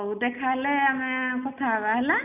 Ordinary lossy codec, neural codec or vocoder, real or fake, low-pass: none; none; real; 3.6 kHz